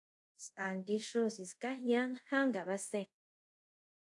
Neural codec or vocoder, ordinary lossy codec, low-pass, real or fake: codec, 24 kHz, 0.5 kbps, DualCodec; AAC, 64 kbps; 10.8 kHz; fake